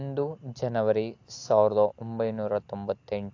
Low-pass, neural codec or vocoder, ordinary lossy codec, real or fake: 7.2 kHz; none; AAC, 48 kbps; real